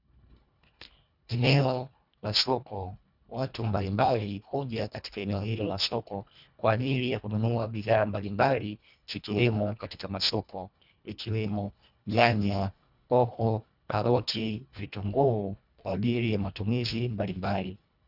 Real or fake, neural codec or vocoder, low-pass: fake; codec, 24 kHz, 1.5 kbps, HILCodec; 5.4 kHz